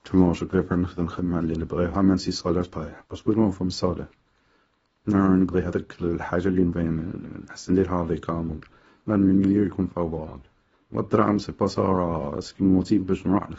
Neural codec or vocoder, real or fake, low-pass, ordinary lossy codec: codec, 24 kHz, 0.9 kbps, WavTokenizer, small release; fake; 10.8 kHz; AAC, 24 kbps